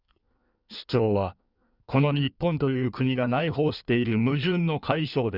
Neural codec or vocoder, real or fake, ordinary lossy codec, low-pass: codec, 16 kHz in and 24 kHz out, 1.1 kbps, FireRedTTS-2 codec; fake; Opus, 64 kbps; 5.4 kHz